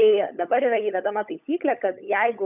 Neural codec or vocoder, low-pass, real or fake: codec, 16 kHz, 16 kbps, FunCodec, trained on LibriTTS, 50 frames a second; 3.6 kHz; fake